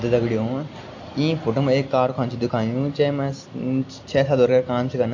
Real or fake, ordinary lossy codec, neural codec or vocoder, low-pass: real; AAC, 48 kbps; none; 7.2 kHz